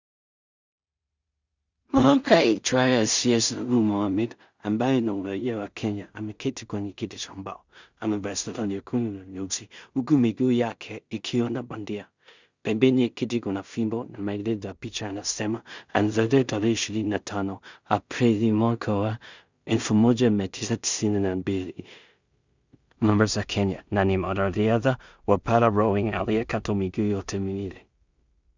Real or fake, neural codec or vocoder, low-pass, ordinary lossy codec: fake; codec, 16 kHz in and 24 kHz out, 0.4 kbps, LongCat-Audio-Codec, two codebook decoder; 7.2 kHz; Opus, 64 kbps